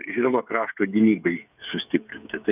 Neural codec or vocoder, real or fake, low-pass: codec, 16 kHz, 8 kbps, FreqCodec, smaller model; fake; 3.6 kHz